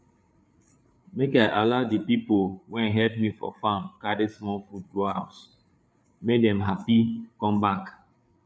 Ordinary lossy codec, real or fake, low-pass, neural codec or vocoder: none; fake; none; codec, 16 kHz, 8 kbps, FreqCodec, larger model